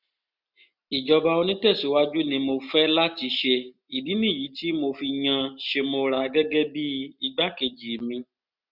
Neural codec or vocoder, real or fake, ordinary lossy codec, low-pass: none; real; none; 5.4 kHz